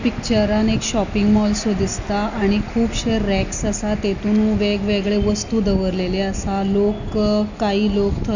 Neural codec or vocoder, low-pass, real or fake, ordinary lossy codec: none; 7.2 kHz; real; none